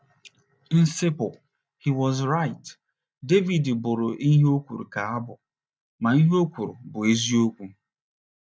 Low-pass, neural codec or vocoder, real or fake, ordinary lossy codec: none; none; real; none